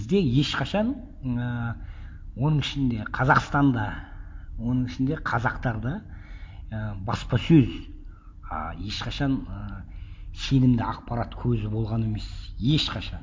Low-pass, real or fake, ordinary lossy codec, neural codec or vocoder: none; real; none; none